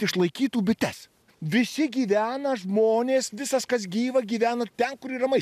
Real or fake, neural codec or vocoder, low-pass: real; none; 14.4 kHz